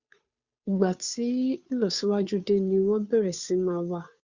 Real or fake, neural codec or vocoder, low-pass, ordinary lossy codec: fake; codec, 16 kHz, 2 kbps, FunCodec, trained on Chinese and English, 25 frames a second; 7.2 kHz; Opus, 64 kbps